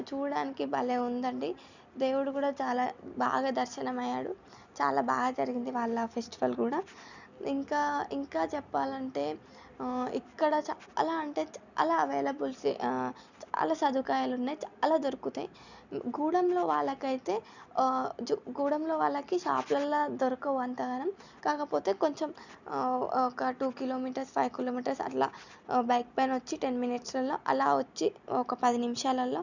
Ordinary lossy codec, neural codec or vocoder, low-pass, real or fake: none; none; 7.2 kHz; real